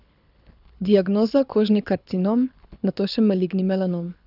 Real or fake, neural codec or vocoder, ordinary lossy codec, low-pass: fake; codec, 24 kHz, 6 kbps, HILCodec; none; 5.4 kHz